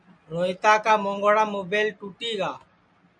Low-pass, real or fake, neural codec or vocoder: 9.9 kHz; real; none